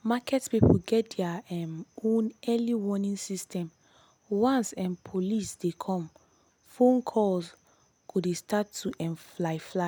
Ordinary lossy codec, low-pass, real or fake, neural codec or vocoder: none; none; real; none